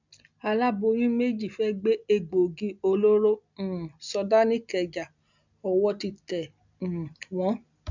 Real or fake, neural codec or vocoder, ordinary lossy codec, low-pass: real; none; none; 7.2 kHz